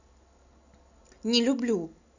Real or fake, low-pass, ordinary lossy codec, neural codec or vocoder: real; 7.2 kHz; none; none